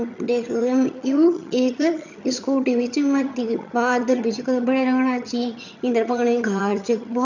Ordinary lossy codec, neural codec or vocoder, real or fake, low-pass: none; vocoder, 22.05 kHz, 80 mel bands, HiFi-GAN; fake; 7.2 kHz